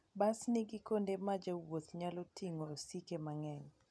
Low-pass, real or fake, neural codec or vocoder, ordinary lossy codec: none; real; none; none